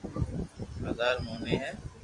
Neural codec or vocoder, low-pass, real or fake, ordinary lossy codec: vocoder, 44.1 kHz, 128 mel bands every 256 samples, BigVGAN v2; 10.8 kHz; fake; Opus, 64 kbps